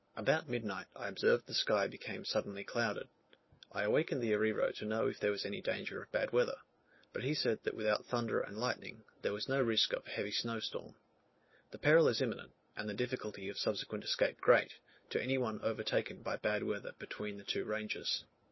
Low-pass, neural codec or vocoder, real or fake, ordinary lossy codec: 7.2 kHz; none; real; MP3, 24 kbps